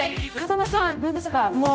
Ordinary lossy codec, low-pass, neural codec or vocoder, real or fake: none; none; codec, 16 kHz, 0.5 kbps, X-Codec, HuBERT features, trained on general audio; fake